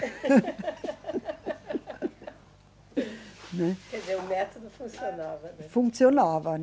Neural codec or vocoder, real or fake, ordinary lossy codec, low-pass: none; real; none; none